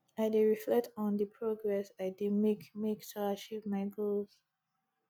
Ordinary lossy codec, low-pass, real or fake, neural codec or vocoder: none; none; real; none